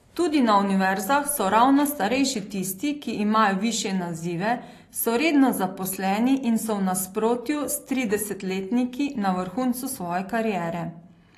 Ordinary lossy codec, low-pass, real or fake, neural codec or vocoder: AAC, 48 kbps; 14.4 kHz; real; none